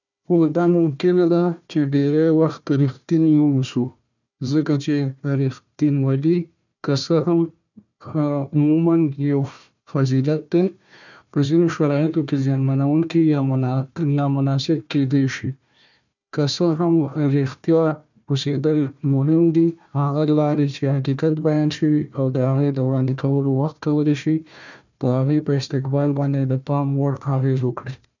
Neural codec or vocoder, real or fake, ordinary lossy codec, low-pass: codec, 16 kHz, 1 kbps, FunCodec, trained on Chinese and English, 50 frames a second; fake; none; 7.2 kHz